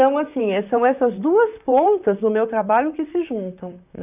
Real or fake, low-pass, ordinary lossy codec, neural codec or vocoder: fake; 3.6 kHz; none; vocoder, 44.1 kHz, 128 mel bands, Pupu-Vocoder